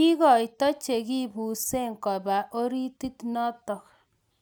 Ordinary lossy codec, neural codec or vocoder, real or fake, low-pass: none; none; real; none